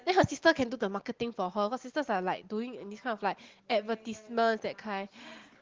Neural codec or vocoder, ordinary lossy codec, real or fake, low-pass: none; Opus, 16 kbps; real; 7.2 kHz